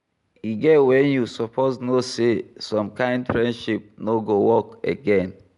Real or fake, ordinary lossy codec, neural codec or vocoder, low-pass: real; none; none; 10.8 kHz